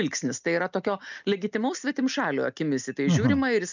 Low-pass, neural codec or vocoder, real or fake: 7.2 kHz; none; real